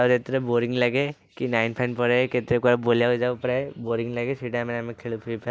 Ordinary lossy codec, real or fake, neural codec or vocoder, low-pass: none; real; none; none